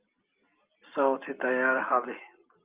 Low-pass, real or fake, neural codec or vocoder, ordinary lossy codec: 3.6 kHz; real; none; Opus, 24 kbps